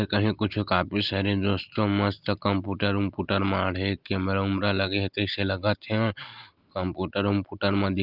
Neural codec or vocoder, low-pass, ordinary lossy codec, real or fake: none; 5.4 kHz; Opus, 32 kbps; real